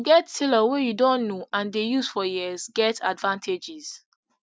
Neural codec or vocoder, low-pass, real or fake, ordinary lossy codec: none; none; real; none